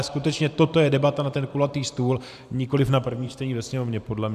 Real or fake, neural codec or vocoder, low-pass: fake; vocoder, 44.1 kHz, 128 mel bands every 512 samples, BigVGAN v2; 14.4 kHz